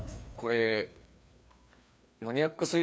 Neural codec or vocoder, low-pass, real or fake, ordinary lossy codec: codec, 16 kHz, 2 kbps, FreqCodec, larger model; none; fake; none